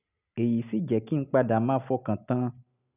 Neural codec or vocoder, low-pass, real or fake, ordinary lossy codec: none; 3.6 kHz; real; none